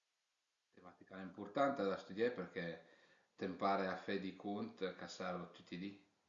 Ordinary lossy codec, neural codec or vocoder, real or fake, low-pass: Opus, 64 kbps; none; real; 7.2 kHz